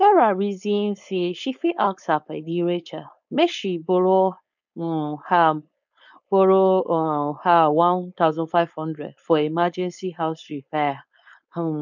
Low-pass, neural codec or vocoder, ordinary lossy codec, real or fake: 7.2 kHz; codec, 16 kHz, 4.8 kbps, FACodec; none; fake